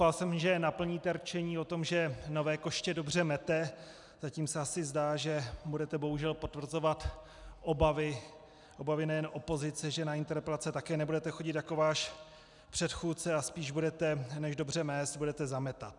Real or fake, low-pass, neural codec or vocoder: real; 10.8 kHz; none